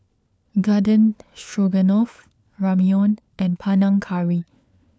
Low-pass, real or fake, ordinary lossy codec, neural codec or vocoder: none; fake; none; codec, 16 kHz, 4 kbps, FunCodec, trained on LibriTTS, 50 frames a second